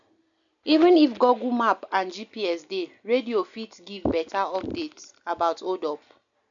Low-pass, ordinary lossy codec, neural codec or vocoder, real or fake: 7.2 kHz; none; none; real